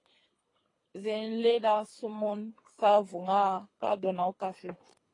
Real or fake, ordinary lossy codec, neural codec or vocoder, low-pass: fake; AAC, 32 kbps; codec, 24 kHz, 3 kbps, HILCodec; 10.8 kHz